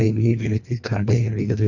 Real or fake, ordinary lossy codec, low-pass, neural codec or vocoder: fake; none; 7.2 kHz; codec, 24 kHz, 1.5 kbps, HILCodec